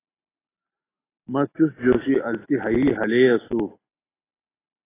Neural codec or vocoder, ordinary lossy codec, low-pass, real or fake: none; AAC, 16 kbps; 3.6 kHz; real